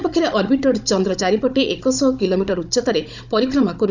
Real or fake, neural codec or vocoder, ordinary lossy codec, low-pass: fake; codec, 16 kHz, 16 kbps, FunCodec, trained on Chinese and English, 50 frames a second; none; 7.2 kHz